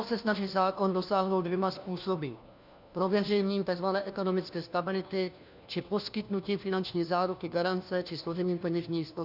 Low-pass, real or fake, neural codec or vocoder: 5.4 kHz; fake; codec, 16 kHz, 1 kbps, FunCodec, trained on LibriTTS, 50 frames a second